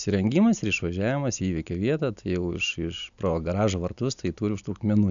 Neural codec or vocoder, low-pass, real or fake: none; 7.2 kHz; real